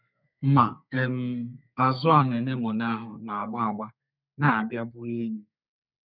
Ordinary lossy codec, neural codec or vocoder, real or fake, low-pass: none; codec, 32 kHz, 1.9 kbps, SNAC; fake; 5.4 kHz